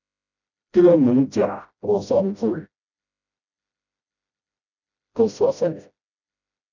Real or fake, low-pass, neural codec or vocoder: fake; 7.2 kHz; codec, 16 kHz, 0.5 kbps, FreqCodec, smaller model